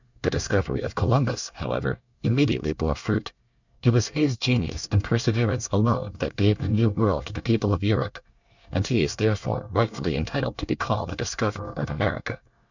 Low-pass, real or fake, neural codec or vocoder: 7.2 kHz; fake; codec, 24 kHz, 1 kbps, SNAC